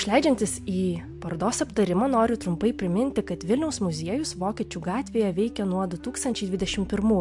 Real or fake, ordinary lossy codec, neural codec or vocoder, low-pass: real; MP3, 64 kbps; none; 10.8 kHz